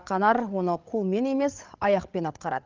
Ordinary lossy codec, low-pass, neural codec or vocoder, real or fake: Opus, 24 kbps; 7.2 kHz; codec, 16 kHz, 8 kbps, FreqCodec, larger model; fake